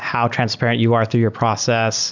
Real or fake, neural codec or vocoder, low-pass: real; none; 7.2 kHz